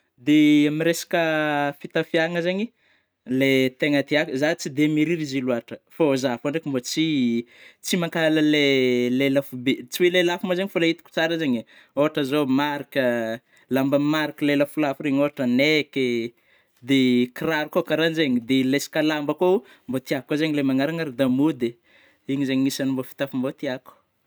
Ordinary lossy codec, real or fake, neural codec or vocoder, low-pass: none; real; none; none